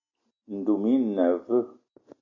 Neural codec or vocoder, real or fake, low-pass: none; real; 7.2 kHz